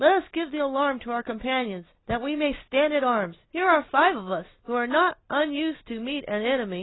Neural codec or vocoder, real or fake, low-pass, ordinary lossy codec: none; real; 7.2 kHz; AAC, 16 kbps